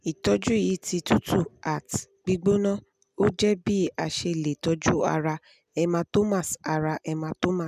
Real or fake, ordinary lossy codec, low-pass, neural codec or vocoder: real; none; 14.4 kHz; none